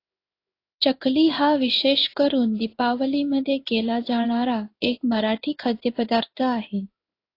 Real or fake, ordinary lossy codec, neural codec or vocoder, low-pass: fake; AAC, 32 kbps; codec, 16 kHz in and 24 kHz out, 1 kbps, XY-Tokenizer; 5.4 kHz